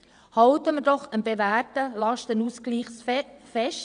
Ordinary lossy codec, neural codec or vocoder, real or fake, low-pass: none; vocoder, 22.05 kHz, 80 mel bands, WaveNeXt; fake; 9.9 kHz